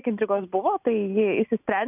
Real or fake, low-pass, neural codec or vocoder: real; 3.6 kHz; none